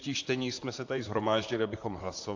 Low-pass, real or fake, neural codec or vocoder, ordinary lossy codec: 7.2 kHz; fake; vocoder, 44.1 kHz, 128 mel bands, Pupu-Vocoder; AAC, 48 kbps